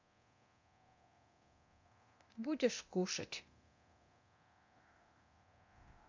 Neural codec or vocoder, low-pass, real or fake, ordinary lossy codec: codec, 24 kHz, 0.9 kbps, DualCodec; 7.2 kHz; fake; MP3, 64 kbps